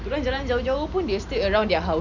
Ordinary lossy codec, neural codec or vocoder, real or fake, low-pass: none; none; real; 7.2 kHz